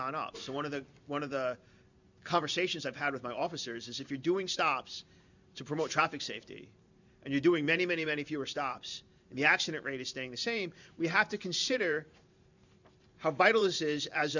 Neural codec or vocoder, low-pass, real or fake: vocoder, 44.1 kHz, 128 mel bands every 512 samples, BigVGAN v2; 7.2 kHz; fake